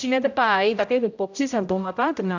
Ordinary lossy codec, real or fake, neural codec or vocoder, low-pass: none; fake; codec, 16 kHz, 0.5 kbps, X-Codec, HuBERT features, trained on general audio; 7.2 kHz